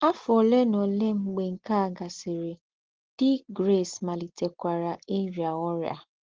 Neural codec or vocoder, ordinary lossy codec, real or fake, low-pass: none; Opus, 16 kbps; real; 7.2 kHz